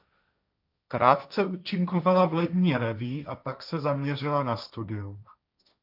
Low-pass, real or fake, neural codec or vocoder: 5.4 kHz; fake; codec, 16 kHz, 1.1 kbps, Voila-Tokenizer